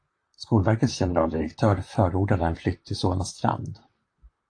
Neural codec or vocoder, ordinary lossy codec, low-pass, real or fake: vocoder, 44.1 kHz, 128 mel bands, Pupu-Vocoder; AAC, 48 kbps; 9.9 kHz; fake